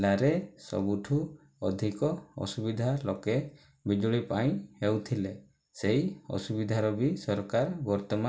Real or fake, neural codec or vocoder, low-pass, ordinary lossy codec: real; none; none; none